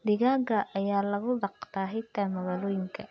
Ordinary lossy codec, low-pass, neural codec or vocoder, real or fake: none; none; none; real